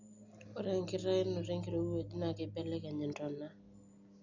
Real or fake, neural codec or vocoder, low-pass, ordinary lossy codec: real; none; 7.2 kHz; none